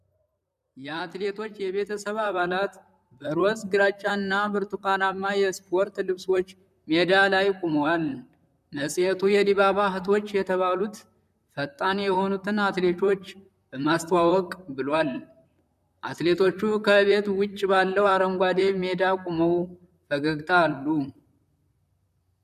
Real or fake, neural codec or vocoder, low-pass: fake; vocoder, 44.1 kHz, 128 mel bands, Pupu-Vocoder; 14.4 kHz